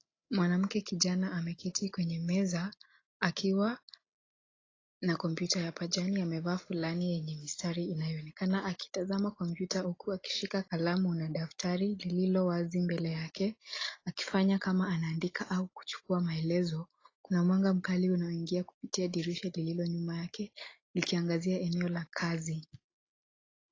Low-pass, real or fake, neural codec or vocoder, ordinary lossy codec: 7.2 kHz; real; none; AAC, 32 kbps